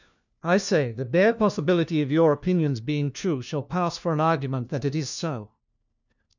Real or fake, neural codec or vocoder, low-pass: fake; codec, 16 kHz, 1 kbps, FunCodec, trained on LibriTTS, 50 frames a second; 7.2 kHz